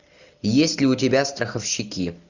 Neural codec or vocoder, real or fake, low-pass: none; real; 7.2 kHz